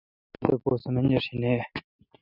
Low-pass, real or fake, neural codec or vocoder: 5.4 kHz; real; none